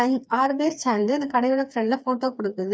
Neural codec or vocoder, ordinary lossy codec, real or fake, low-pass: codec, 16 kHz, 4 kbps, FreqCodec, smaller model; none; fake; none